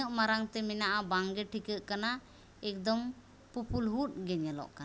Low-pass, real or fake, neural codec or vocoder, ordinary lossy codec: none; real; none; none